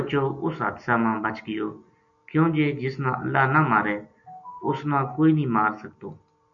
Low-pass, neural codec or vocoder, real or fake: 7.2 kHz; none; real